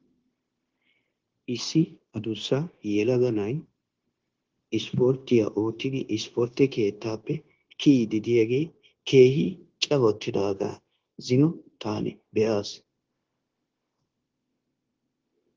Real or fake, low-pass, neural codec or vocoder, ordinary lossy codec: fake; 7.2 kHz; codec, 16 kHz, 0.9 kbps, LongCat-Audio-Codec; Opus, 16 kbps